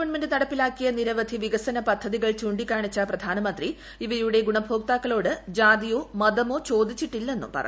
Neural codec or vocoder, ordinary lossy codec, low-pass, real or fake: none; none; none; real